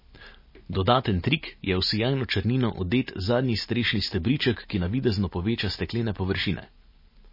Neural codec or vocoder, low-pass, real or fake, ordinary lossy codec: none; 5.4 kHz; real; MP3, 24 kbps